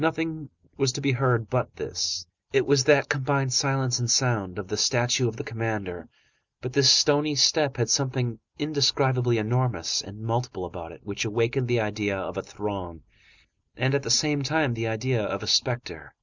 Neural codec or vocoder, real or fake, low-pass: none; real; 7.2 kHz